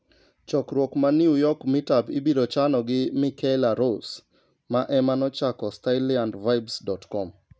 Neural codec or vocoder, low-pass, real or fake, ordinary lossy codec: none; none; real; none